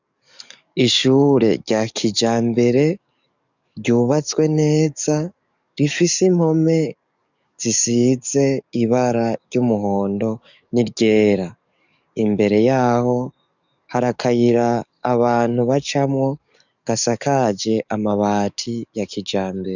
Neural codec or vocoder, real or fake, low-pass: codec, 44.1 kHz, 7.8 kbps, DAC; fake; 7.2 kHz